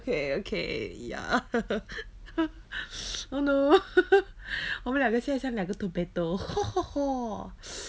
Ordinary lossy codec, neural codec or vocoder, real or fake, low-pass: none; none; real; none